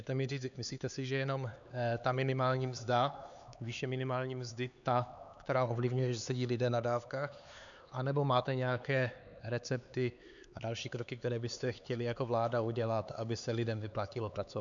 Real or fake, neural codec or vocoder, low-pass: fake; codec, 16 kHz, 4 kbps, X-Codec, HuBERT features, trained on LibriSpeech; 7.2 kHz